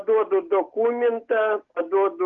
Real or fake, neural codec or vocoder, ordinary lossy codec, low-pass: real; none; Opus, 32 kbps; 7.2 kHz